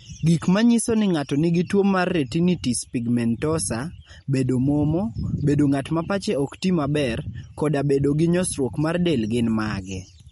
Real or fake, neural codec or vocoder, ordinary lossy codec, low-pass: real; none; MP3, 48 kbps; 19.8 kHz